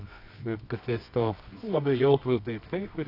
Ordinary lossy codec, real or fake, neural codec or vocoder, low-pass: AAC, 32 kbps; fake; codec, 24 kHz, 0.9 kbps, WavTokenizer, medium music audio release; 5.4 kHz